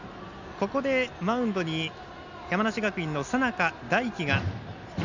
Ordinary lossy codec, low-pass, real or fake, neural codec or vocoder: none; 7.2 kHz; real; none